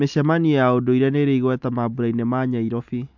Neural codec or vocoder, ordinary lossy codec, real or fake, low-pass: none; MP3, 64 kbps; real; 7.2 kHz